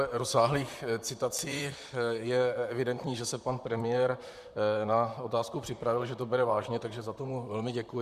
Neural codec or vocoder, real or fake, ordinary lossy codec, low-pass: vocoder, 44.1 kHz, 128 mel bands, Pupu-Vocoder; fake; MP3, 96 kbps; 14.4 kHz